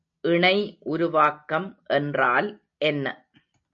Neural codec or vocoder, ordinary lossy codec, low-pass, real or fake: none; MP3, 64 kbps; 7.2 kHz; real